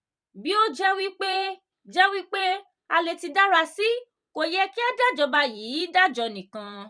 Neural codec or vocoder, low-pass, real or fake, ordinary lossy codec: vocoder, 44.1 kHz, 128 mel bands every 256 samples, BigVGAN v2; 9.9 kHz; fake; none